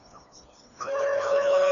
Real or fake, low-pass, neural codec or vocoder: fake; 7.2 kHz; codec, 16 kHz, 2 kbps, FreqCodec, smaller model